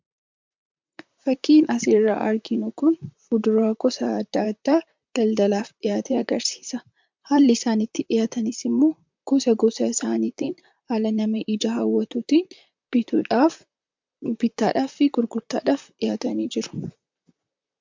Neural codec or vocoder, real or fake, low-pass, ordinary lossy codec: vocoder, 44.1 kHz, 128 mel bands, Pupu-Vocoder; fake; 7.2 kHz; MP3, 64 kbps